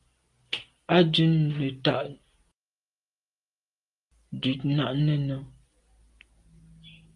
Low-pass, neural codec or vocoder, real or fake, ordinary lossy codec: 10.8 kHz; none; real; Opus, 32 kbps